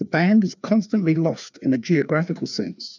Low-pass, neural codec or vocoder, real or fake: 7.2 kHz; codec, 16 kHz, 2 kbps, FreqCodec, larger model; fake